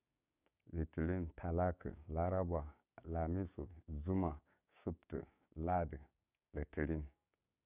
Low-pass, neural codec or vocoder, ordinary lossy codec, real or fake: 3.6 kHz; codec, 16 kHz, 6 kbps, DAC; none; fake